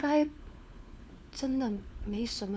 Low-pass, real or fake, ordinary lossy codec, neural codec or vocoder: none; fake; none; codec, 16 kHz, 4 kbps, FunCodec, trained on LibriTTS, 50 frames a second